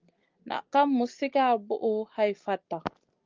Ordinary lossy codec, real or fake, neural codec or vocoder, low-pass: Opus, 32 kbps; real; none; 7.2 kHz